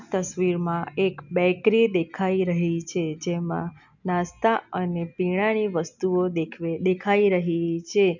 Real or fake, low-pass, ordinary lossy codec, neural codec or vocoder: real; 7.2 kHz; none; none